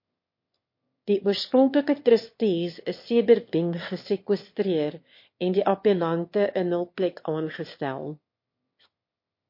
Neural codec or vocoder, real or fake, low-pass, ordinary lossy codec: autoencoder, 22.05 kHz, a latent of 192 numbers a frame, VITS, trained on one speaker; fake; 5.4 kHz; MP3, 32 kbps